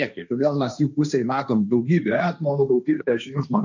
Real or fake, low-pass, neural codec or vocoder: fake; 7.2 kHz; codec, 16 kHz, 1.1 kbps, Voila-Tokenizer